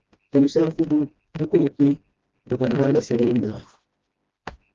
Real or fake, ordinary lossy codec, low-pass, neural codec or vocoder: fake; Opus, 32 kbps; 7.2 kHz; codec, 16 kHz, 1 kbps, FreqCodec, smaller model